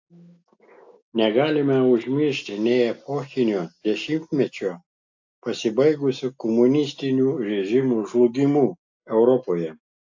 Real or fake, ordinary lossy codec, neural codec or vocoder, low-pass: real; AAC, 48 kbps; none; 7.2 kHz